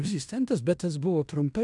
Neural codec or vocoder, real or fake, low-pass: codec, 16 kHz in and 24 kHz out, 0.9 kbps, LongCat-Audio-Codec, fine tuned four codebook decoder; fake; 10.8 kHz